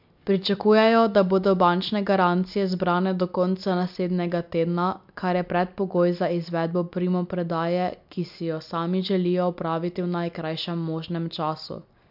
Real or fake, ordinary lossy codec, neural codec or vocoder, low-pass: real; MP3, 48 kbps; none; 5.4 kHz